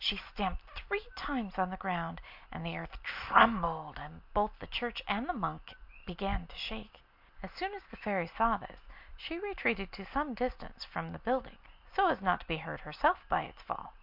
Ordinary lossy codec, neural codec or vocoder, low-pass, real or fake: MP3, 48 kbps; none; 5.4 kHz; real